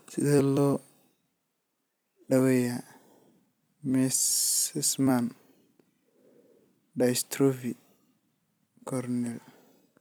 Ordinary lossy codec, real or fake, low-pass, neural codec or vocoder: none; fake; none; vocoder, 44.1 kHz, 128 mel bands every 256 samples, BigVGAN v2